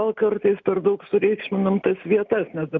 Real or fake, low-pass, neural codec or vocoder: real; 7.2 kHz; none